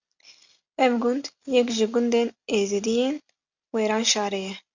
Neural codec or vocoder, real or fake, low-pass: none; real; 7.2 kHz